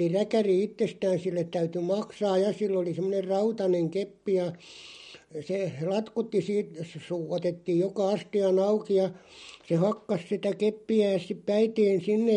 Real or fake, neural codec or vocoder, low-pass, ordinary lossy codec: real; none; 19.8 kHz; MP3, 48 kbps